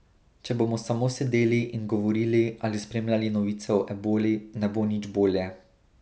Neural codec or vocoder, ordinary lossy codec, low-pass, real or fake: none; none; none; real